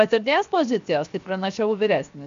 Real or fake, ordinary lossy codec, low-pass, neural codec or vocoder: fake; MP3, 64 kbps; 7.2 kHz; codec, 16 kHz, 0.7 kbps, FocalCodec